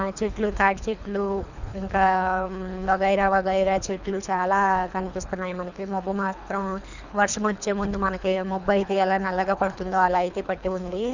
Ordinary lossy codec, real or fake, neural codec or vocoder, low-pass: none; fake; codec, 24 kHz, 3 kbps, HILCodec; 7.2 kHz